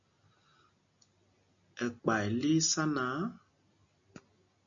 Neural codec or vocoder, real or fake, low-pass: none; real; 7.2 kHz